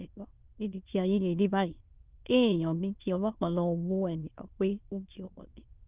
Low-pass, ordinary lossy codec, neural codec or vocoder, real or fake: 3.6 kHz; Opus, 32 kbps; autoencoder, 22.05 kHz, a latent of 192 numbers a frame, VITS, trained on many speakers; fake